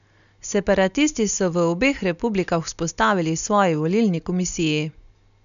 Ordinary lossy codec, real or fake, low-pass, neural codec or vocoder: none; real; 7.2 kHz; none